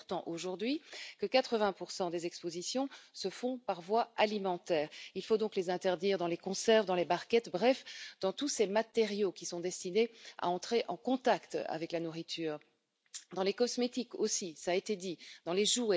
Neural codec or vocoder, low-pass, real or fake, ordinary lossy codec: none; none; real; none